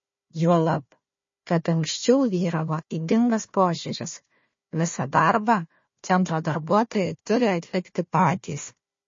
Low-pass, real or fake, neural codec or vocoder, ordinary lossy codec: 7.2 kHz; fake; codec, 16 kHz, 1 kbps, FunCodec, trained on Chinese and English, 50 frames a second; MP3, 32 kbps